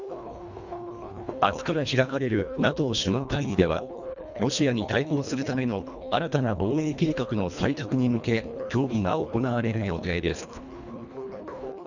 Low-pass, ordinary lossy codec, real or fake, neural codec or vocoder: 7.2 kHz; none; fake; codec, 24 kHz, 1.5 kbps, HILCodec